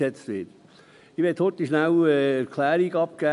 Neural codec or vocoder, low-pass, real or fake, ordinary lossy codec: none; 10.8 kHz; real; none